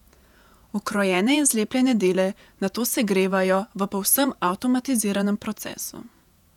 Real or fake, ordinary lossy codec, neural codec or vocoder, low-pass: fake; none; vocoder, 48 kHz, 128 mel bands, Vocos; 19.8 kHz